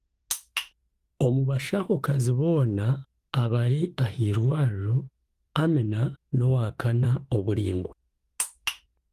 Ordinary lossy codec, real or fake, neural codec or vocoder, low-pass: Opus, 24 kbps; fake; autoencoder, 48 kHz, 32 numbers a frame, DAC-VAE, trained on Japanese speech; 14.4 kHz